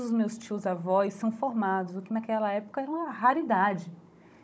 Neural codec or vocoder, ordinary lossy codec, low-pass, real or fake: codec, 16 kHz, 16 kbps, FunCodec, trained on Chinese and English, 50 frames a second; none; none; fake